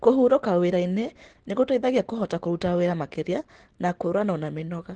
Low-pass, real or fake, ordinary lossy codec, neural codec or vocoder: 9.9 kHz; real; Opus, 16 kbps; none